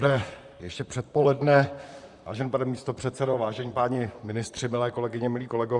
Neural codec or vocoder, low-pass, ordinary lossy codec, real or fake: vocoder, 44.1 kHz, 128 mel bands, Pupu-Vocoder; 10.8 kHz; Opus, 64 kbps; fake